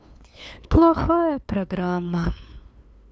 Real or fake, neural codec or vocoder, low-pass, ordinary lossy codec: fake; codec, 16 kHz, 2 kbps, FunCodec, trained on LibriTTS, 25 frames a second; none; none